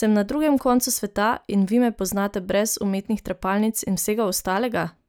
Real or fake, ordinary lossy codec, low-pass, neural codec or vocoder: real; none; none; none